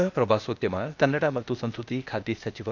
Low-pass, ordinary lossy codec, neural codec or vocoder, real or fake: 7.2 kHz; none; codec, 16 kHz, 0.8 kbps, ZipCodec; fake